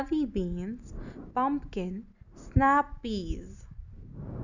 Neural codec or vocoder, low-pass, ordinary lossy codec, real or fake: none; 7.2 kHz; none; real